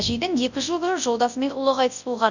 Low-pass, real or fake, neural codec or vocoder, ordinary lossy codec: 7.2 kHz; fake; codec, 24 kHz, 0.9 kbps, WavTokenizer, large speech release; none